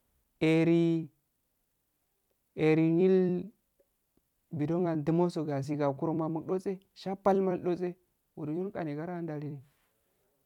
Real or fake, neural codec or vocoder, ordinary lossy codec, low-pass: fake; vocoder, 44.1 kHz, 128 mel bands every 256 samples, BigVGAN v2; none; 19.8 kHz